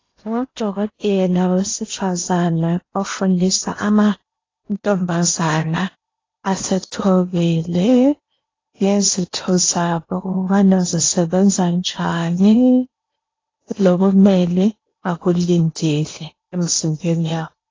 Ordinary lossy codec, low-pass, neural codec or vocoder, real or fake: AAC, 32 kbps; 7.2 kHz; codec, 16 kHz in and 24 kHz out, 0.8 kbps, FocalCodec, streaming, 65536 codes; fake